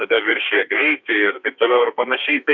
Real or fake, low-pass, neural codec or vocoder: fake; 7.2 kHz; codec, 32 kHz, 1.9 kbps, SNAC